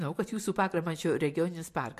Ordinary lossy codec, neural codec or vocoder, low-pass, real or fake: MP3, 64 kbps; none; 14.4 kHz; real